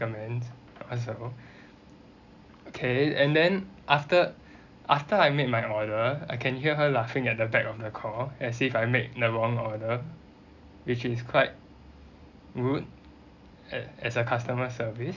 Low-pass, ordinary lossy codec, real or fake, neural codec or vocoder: 7.2 kHz; none; real; none